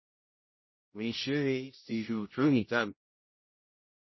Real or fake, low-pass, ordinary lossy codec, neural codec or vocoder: fake; 7.2 kHz; MP3, 24 kbps; codec, 16 kHz, 0.5 kbps, X-Codec, HuBERT features, trained on general audio